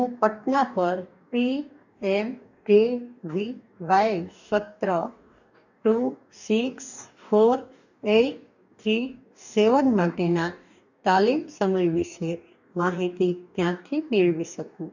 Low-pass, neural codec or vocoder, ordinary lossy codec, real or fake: 7.2 kHz; codec, 44.1 kHz, 2.6 kbps, DAC; none; fake